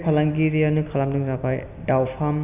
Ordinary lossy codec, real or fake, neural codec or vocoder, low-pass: none; real; none; 3.6 kHz